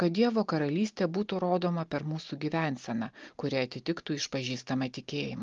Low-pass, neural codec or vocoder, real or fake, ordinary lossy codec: 7.2 kHz; none; real; Opus, 32 kbps